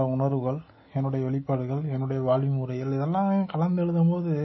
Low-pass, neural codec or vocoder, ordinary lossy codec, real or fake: 7.2 kHz; none; MP3, 24 kbps; real